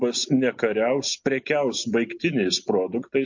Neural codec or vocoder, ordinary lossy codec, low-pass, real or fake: none; MP3, 48 kbps; 7.2 kHz; real